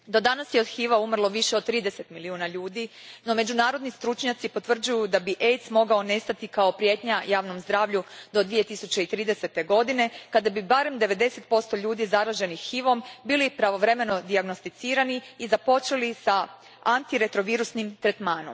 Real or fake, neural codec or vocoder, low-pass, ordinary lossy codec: real; none; none; none